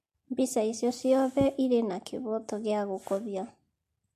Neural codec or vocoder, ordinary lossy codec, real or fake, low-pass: none; MP3, 64 kbps; real; 14.4 kHz